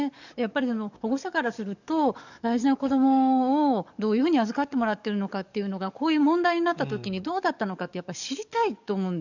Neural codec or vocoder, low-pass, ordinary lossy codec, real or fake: codec, 44.1 kHz, 7.8 kbps, DAC; 7.2 kHz; none; fake